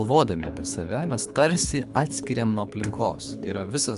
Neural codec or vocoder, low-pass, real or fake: codec, 24 kHz, 3 kbps, HILCodec; 10.8 kHz; fake